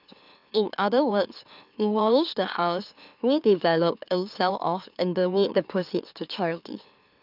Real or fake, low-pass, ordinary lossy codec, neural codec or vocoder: fake; 5.4 kHz; none; autoencoder, 44.1 kHz, a latent of 192 numbers a frame, MeloTTS